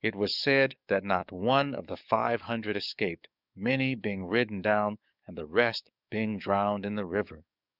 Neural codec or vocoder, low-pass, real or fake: codec, 44.1 kHz, 7.8 kbps, DAC; 5.4 kHz; fake